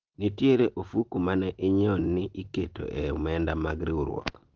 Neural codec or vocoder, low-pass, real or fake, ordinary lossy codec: none; 7.2 kHz; real; Opus, 16 kbps